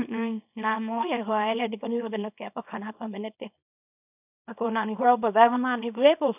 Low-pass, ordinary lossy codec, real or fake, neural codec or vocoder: 3.6 kHz; none; fake; codec, 24 kHz, 0.9 kbps, WavTokenizer, small release